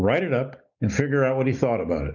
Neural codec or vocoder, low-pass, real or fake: none; 7.2 kHz; real